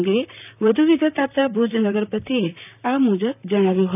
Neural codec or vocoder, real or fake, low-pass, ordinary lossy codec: vocoder, 44.1 kHz, 128 mel bands, Pupu-Vocoder; fake; 3.6 kHz; none